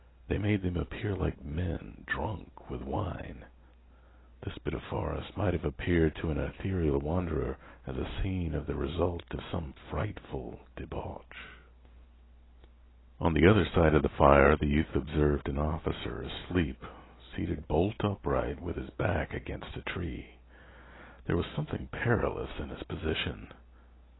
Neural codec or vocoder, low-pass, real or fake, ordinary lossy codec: none; 7.2 kHz; real; AAC, 16 kbps